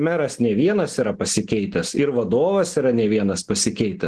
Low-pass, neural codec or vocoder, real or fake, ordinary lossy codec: 10.8 kHz; none; real; Opus, 16 kbps